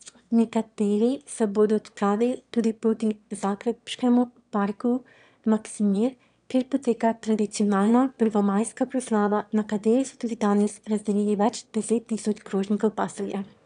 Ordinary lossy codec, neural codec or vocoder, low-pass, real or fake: none; autoencoder, 22.05 kHz, a latent of 192 numbers a frame, VITS, trained on one speaker; 9.9 kHz; fake